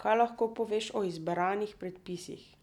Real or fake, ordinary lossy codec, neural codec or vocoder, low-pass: real; none; none; 19.8 kHz